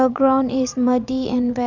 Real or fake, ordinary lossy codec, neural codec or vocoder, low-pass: fake; none; vocoder, 22.05 kHz, 80 mel bands, Vocos; 7.2 kHz